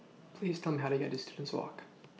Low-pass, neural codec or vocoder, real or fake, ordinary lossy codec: none; none; real; none